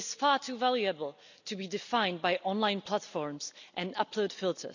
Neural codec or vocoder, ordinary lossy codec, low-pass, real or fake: none; none; 7.2 kHz; real